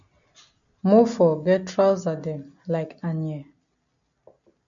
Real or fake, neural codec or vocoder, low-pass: real; none; 7.2 kHz